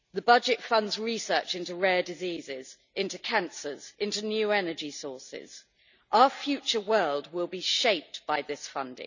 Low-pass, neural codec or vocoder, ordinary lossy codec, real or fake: 7.2 kHz; none; none; real